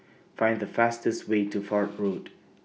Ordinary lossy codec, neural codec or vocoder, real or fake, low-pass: none; none; real; none